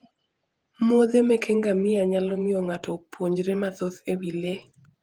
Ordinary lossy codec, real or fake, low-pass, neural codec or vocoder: Opus, 24 kbps; fake; 19.8 kHz; vocoder, 48 kHz, 128 mel bands, Vocos